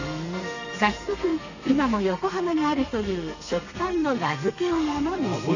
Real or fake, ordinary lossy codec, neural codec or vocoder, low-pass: fake; none; codec, 32 kHz, 1.9 kbps, SNAC; 7.2 kHz